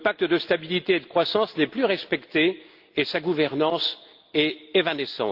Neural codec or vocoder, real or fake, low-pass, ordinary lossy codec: none; real; 5.4 kHz; Opus, 32 kbps